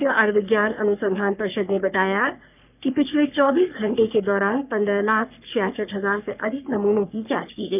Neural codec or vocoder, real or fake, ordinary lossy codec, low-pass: codec, 44.1 kHz, 3.4 kbps, Pupu-Codec; fake; none; 3.6 kHz